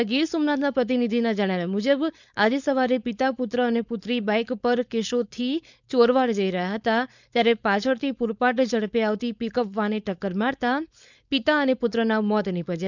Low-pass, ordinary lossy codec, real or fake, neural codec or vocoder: 7.2 kHz; none; fake; codec, 16 kHz, 4.8 kbps, FACodec